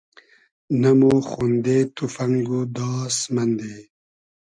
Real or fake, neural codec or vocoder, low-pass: real; none; 9.9 kHz